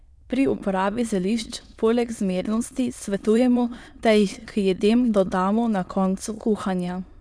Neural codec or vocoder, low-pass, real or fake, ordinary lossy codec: autoencoder, 22.05 kHz, a latent of 192 numbers a frame, VITS, trained on many speakers; none; fake; none